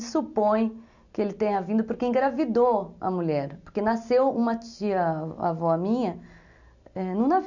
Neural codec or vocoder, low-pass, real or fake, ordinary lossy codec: none; 7.2 kHz; real; none